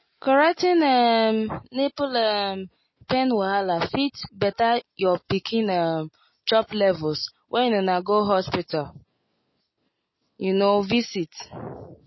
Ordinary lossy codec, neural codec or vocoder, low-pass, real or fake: MP3, 24 kbps; none; 7.2 kHz; real